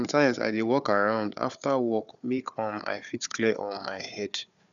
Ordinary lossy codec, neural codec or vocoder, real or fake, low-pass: none; codec, 16 kHz, 4 kbps, FunCodec, trained on Chinese and English, 50 frames a second; fake; 7.2 kHz